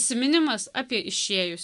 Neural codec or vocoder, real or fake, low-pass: none; real; 10.8 kHz